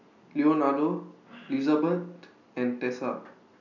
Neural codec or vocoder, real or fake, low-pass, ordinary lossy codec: none; real; 7.2 kHz; none